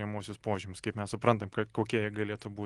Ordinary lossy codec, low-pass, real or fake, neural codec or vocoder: Opus, 24 kbps; 10.8 kHz; real; none